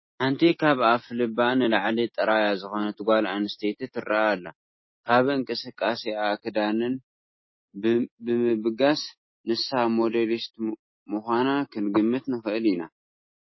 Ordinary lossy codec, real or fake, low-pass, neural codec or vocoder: MP3, 24 kbps; real; 7.2 kHz; none